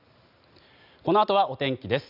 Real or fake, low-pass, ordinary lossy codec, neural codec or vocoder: real; 5.4 kHz; none; none